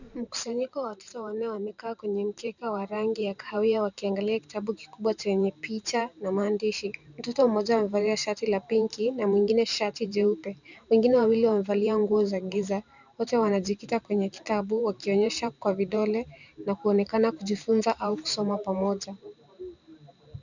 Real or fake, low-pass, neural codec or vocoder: fake; 7.2 kHz; vocoder, 44.1 kHz, 128 mel bands every 512 samples, BigVGAN v2